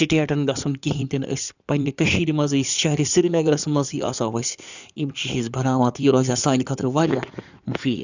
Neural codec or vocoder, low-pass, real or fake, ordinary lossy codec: codec, 16 kHz in and 24 kHz out, 2.2 kbps, FireRedTTS-2 codec; 7.2 kHz; fake; none